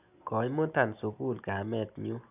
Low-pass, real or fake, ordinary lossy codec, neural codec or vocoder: 3.6 kHz; real; none; none